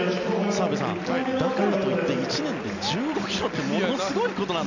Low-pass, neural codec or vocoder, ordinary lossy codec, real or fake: 7.2 kHz; none; none; real